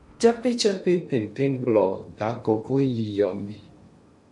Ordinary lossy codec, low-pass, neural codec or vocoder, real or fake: MP3, 48 kbps; 10.8 kHz; codec, 16 kHz in and 24 kHz out, 0.8 kbps, FocalCodec, streaming, 65536 codes; fake